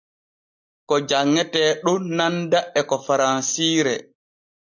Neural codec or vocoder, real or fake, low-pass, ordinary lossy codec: none; real; 7.2 kHz; AAC, 48 kbps